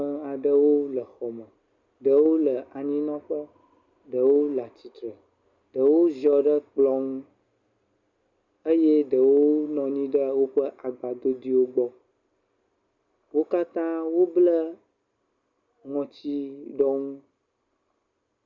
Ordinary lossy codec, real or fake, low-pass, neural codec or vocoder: Opus, 64 kbps; real; 7.2 kHz; none